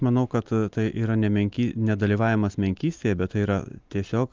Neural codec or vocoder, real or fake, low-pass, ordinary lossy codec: none; real; 7.2 kHz; Opus, 32 kbps